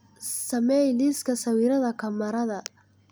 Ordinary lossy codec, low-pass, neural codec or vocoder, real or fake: none; none; none; real